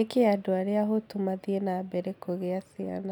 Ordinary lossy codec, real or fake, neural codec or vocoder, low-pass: none; real; none; 19.8 kHz